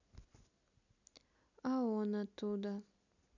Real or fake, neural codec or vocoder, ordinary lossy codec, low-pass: real; none; none; 7.2 kHz